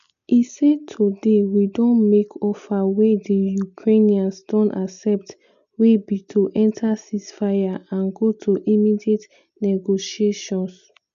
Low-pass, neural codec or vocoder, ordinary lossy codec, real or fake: 7.2 kHz; none; none; real